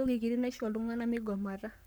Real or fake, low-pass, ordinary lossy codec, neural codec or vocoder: fake; none; none; codec, 44.1 kHz, 7.8 kbps, Pupu-Codec